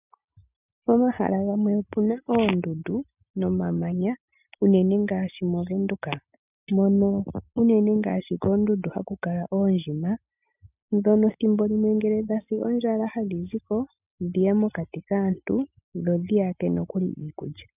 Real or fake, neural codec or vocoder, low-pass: real; none; 3.6 kHz